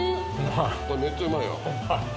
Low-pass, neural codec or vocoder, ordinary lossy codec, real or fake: none; none; none; real